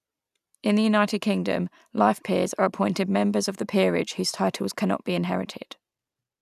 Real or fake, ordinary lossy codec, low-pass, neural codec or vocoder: real; none; 14.4 kHz; none